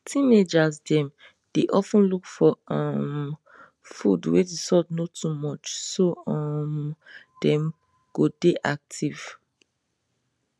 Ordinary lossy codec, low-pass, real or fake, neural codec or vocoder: none; none; real; none